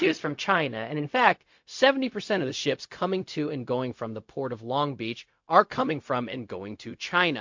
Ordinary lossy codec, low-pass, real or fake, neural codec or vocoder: MP3, 48 kbps; 7.2 kHz; fake; codec, 16 kHz, 0.4 kbps, LongCat-Audio-Codec